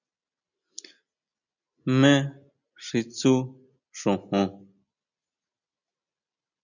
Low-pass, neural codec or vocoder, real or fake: 7.2 kHz; none; real